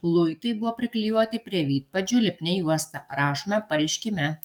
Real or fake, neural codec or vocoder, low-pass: fake; codec, 44.1 kHz, 7.8 kbps, DAC; 19.8 kHz